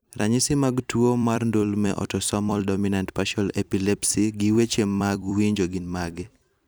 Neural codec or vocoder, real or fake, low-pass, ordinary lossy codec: vocoder, 44.1 kHz, 128 mel bands every 256 samples, BigVGAN v2; fake; none; none